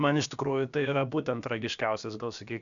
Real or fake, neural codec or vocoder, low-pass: fake; codec, 16 kHz, about 1 kbps, DyCAST, with the encoder's durations; 7.2 kHz